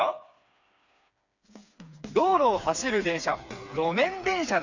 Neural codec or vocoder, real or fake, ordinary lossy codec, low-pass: codec, 16 kHz, 4 kbps, FreqCodec, smaller model; fake; none; 7.2 kHz